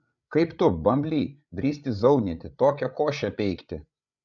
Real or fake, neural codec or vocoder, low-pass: fake; codec, 16 kHz, 8 kbps, FreqCodec, larger model; 7.2 kHz